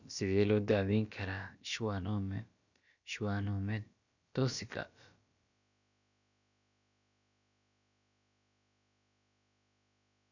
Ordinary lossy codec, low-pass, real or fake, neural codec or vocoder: none; 7.2 kHz; fake; codec, 16 kHz, about 1 kbps, DyCAST, with the encoder's durations